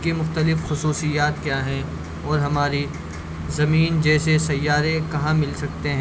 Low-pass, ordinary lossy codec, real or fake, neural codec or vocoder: none; none; real; none